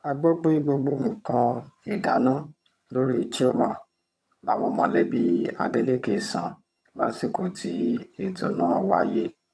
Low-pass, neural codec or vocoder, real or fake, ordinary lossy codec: none; vocoder, 22.05 kHz, 80 mel bands, HiFi-GAN; fake; none